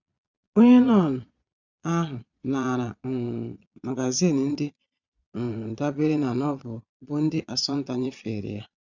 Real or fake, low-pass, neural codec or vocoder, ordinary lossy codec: fake; 7.2 kHz; vocoder, 22.05 kHz, 80 mel bands, Vocos; none